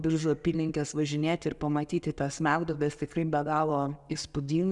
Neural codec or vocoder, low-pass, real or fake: none; 10.8 kHz; real